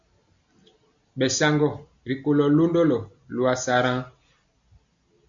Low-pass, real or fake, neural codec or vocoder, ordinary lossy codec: 7.2 kHz; real; none; MP3, 64 kbps